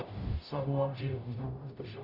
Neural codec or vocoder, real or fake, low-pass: codec, 44.1 kHz, 0.9 kbps, DAC; fake; 5.4 kHz